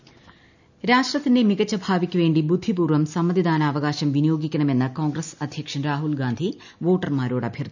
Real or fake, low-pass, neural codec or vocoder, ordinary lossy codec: real; 7.2 kHz; none; none